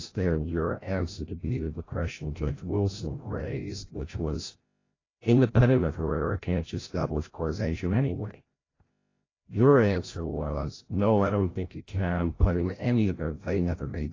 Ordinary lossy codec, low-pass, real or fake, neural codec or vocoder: AAC, 32 kbps; 7.2 kHz; fake; codec, 16 kHz, 0.5 kbps, FreqCodec, larger model